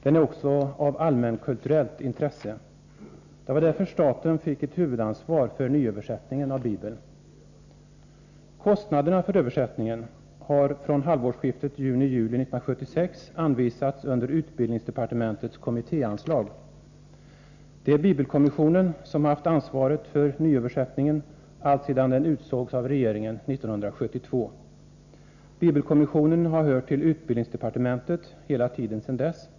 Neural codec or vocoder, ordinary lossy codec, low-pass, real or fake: none; none; 7.2 kHz; real